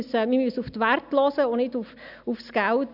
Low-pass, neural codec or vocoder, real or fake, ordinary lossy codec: 5.4 kHz; none; real; none